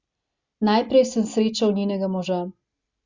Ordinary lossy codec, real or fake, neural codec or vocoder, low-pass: Opus, 64 kbps; real; none; 7.2 kHz